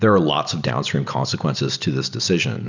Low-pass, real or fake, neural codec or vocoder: 7.2 kHz; real; none